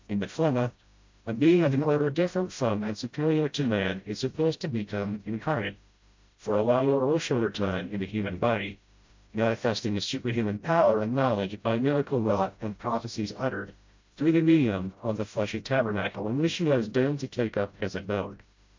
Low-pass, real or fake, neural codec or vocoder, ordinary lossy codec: 7.2 kHz; fake; codec, 16 kHz, 0.5 kbps, FreqCodec, smaller model; MP3, 48 kbps